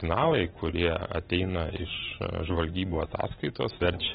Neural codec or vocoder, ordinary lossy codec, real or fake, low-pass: none; AAC, 16 kbps; real; 19.8 kHz